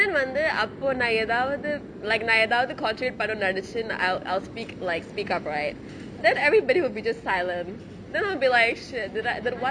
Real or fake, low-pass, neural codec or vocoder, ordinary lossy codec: real; 9.9 kHz; none; Opus, 64 kbps